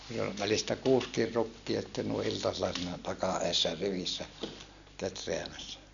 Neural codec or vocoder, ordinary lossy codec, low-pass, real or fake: none; none; 7.2 kHz; real